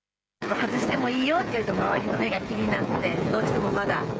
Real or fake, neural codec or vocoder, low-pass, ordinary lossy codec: fake; codec, 16 kHz, 8 kbps, FreqCodec, smaller model; none; none